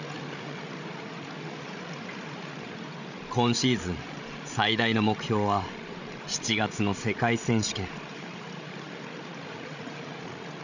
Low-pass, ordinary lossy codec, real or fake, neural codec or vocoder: 7.2 kHz; none; fake; codec, 16 kHz, 16 kbps, FreqCodec, larger model